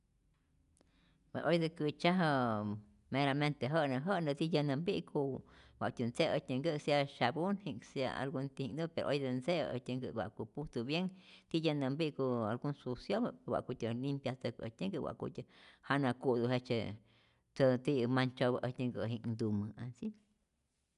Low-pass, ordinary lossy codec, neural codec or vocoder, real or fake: 10.8 kHz; none; none; real